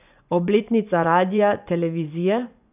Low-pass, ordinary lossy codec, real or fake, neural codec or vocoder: 3.6 kHz; none; fake; vocoder, 24 kHz, 100 mel bands, Vocos